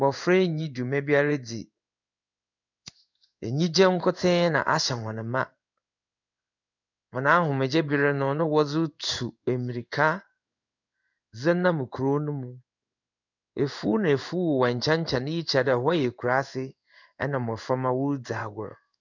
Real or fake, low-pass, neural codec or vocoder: fake; 7.2 kHz; codec, 16 kHz in and 24 kHz out, 1 kbps, XY-Tokenizer